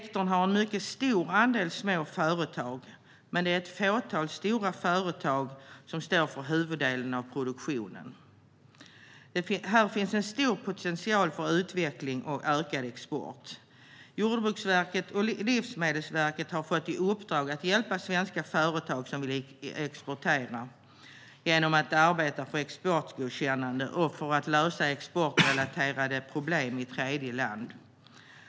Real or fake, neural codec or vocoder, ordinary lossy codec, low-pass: real; none; none; none